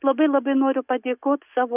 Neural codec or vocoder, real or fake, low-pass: none; real; 3.6 kHz